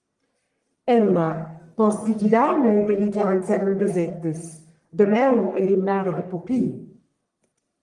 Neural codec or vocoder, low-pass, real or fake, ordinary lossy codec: codec, 44.1 kHz, 1.7 kbps, Pupu-Codec; 10.8 kHz; fake; Opus, 32 kbps